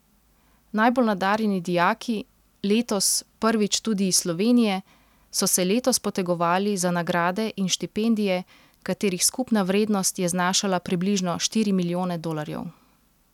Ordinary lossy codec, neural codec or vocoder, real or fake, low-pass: none; none; real; 19.8 kHz